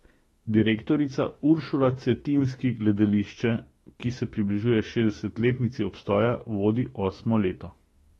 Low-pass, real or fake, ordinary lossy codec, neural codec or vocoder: 19.8 kHz; fake; AAC, 32 kbps; autoencoder, 48 kHz, 32 numbers a frame, DAC-VAE, trained on Japanese speech